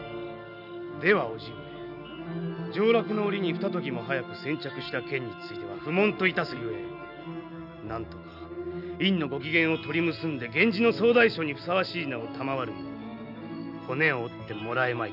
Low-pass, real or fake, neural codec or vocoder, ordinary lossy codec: 5.4 kHz; real; none; none